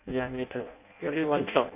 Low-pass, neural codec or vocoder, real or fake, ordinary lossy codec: 3.6 kHz; codec, 16 kHz in and 24 kHz out, 0.6 kbps, FireRedTTS-2 codec; fake; none